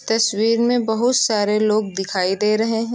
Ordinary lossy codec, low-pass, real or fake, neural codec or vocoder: none; none; real; none